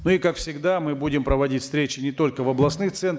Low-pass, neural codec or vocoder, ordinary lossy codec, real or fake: none; none; none; real